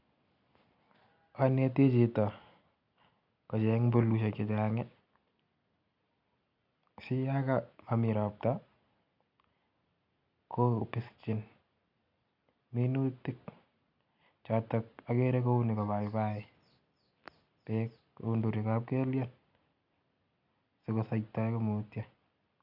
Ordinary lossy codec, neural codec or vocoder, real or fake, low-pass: none; none; real; 5.4 kHz